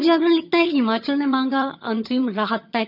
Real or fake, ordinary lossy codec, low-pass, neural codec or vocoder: fake; none; 5.4 kHz; vocoder, 22.05 kHz, 80 mel bands, HiFi-GAN